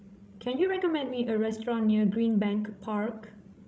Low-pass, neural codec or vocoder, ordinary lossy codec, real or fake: none; codec, 16 kHz, 16 kbps, FreqCodec, larger model; none; fake